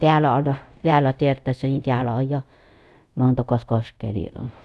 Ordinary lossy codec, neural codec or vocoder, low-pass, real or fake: none; codec, 24 kHz, 0.5 kbps, DualCodec; none; fake